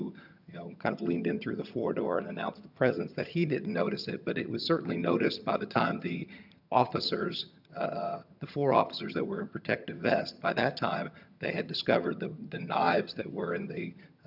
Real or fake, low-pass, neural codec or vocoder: fake; 5.4 kHz; vocoder, 22.05 kHz, 80 mel bands, HiFi-GAN